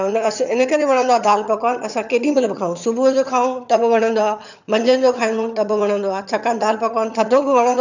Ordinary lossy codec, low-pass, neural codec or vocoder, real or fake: none; 7.2 kHz; vocoder, 22.05 kHz, 80 mel bands, HiFi-GAN; fake